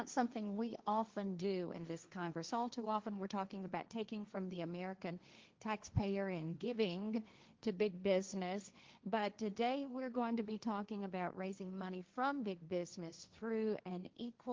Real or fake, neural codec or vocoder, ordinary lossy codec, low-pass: fake; codec, 16 kHz, 1.1 kbps, Voila-Tokenizer; Opus, 32 kbps; 7.2 kHz